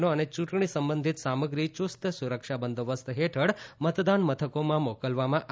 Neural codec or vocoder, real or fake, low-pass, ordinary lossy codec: none; real; none; none